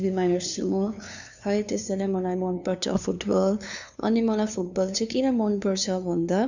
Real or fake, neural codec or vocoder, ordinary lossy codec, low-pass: fake; codec, 16 kHz, 2 kbps, FunCodec, trained on LibriTTS, 25 frames a second; none; 7.2 kHz